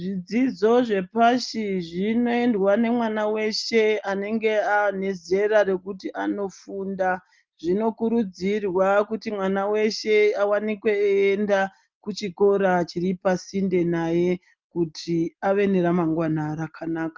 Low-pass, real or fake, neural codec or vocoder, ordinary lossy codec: 7.2 kHz; real; none; Opus, 32 kbps